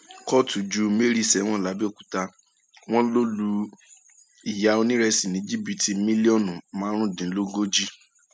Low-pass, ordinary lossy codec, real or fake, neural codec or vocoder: none; none; real; none